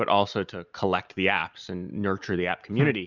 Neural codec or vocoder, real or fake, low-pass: none; real; 7.2 kHz